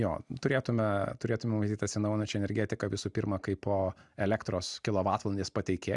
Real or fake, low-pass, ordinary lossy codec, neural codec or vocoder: real; 10.8 kHz; Opus, 64 kbps; none